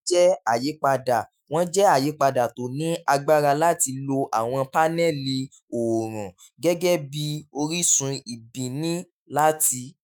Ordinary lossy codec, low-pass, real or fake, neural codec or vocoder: none; none; fake; autoencoder, 48 kHz, 128 numbers a frame, DAC-VAE, trained on Japanese speech